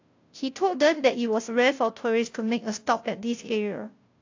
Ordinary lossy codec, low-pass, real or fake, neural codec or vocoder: AAC, 48 kbps; 7.2 kHz; fake; codec, 16 kHz, 0.5 kbps, FunCodec, trained on Chinese and English, 25 frames a second